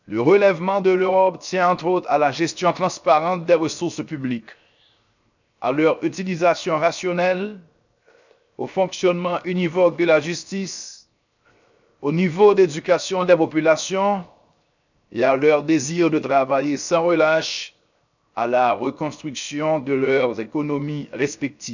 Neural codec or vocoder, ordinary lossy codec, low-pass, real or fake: codec, 16 kHz, 0.7 kbps, FocalCodec; none; 7.2 kHz; fake